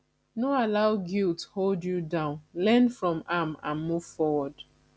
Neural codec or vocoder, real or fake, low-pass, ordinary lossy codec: none; real; none; none